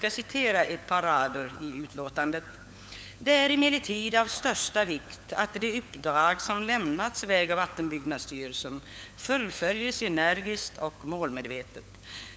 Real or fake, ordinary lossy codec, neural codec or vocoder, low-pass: fake; none; codec, 16 kHz, 4 kbps, FunCodec, trained on LibriTTS, 50 frames a second; none